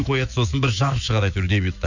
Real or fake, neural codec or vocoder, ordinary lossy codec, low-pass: fake; autoencoder, 48 kHz, 128 numbers a frame, DAC-VAE, trained on Japanese speech; none; 7.2 kHz